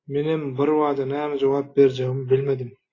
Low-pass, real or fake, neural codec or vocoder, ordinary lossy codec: 7.2 kHz; real; none; AAC, 32 kbps